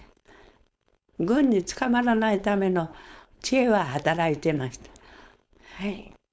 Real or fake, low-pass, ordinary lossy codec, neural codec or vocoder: fake; none; none; codec, 16 kHz, 4.8 kbps, FACodec